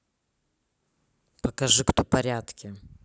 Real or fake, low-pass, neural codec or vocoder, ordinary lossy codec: real; none; none; none